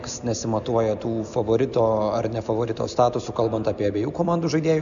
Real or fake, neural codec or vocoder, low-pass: real; none; 7.2 kHz